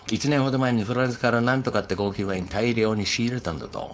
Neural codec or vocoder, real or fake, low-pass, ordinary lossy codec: codec, 16 kHz, 4.8 kbps, FACodec; fake; none; none